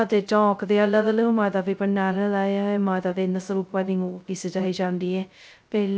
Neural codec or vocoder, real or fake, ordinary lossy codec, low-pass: codec, 16 kHz, 0.2 kbps, FocalCodec; fake; none; none